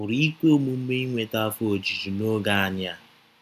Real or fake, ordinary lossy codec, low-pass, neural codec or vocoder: real; none; 14.4 kHz; none